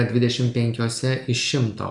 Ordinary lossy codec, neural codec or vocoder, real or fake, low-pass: MP3, 96 kbps; none; real; 10.8 kHz